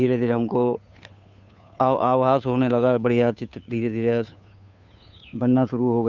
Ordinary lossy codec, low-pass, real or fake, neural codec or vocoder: none; 7.2 kHz; fake; codec, 16 kHz, 2 kbps, FunCodec, trained on Chinese and English, 25 frames a second